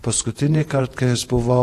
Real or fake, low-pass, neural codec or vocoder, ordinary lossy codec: fake; 14.4 kHz; vocoder, 48 kHz, 128 mel bands, Vocos; AAC, 64 kbps